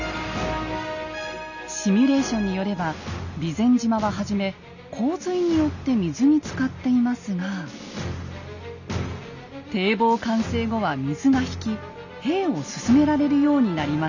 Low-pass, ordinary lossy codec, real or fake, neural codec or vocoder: 7.2 kHz; none; real; none